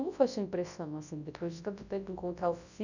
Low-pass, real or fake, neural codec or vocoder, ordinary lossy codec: 7.2 kHz; fake; codec, 24 kHz, 0.9 kbps, WavTokenizer, large speech release; none